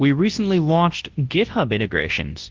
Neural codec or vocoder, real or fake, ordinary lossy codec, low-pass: codec, 24 kHz, 0.9 kbps, WavTokenizer, large speech release; fake; Opus, 16 kbps; 7.2 kHz